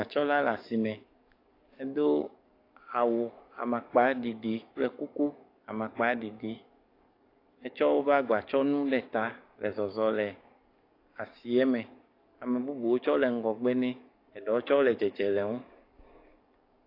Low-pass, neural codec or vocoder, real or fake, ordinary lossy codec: 5.4 kHz; codec, 44.1 kHz, 7.8 kbps, DAC; fake; AAC, 32 kbps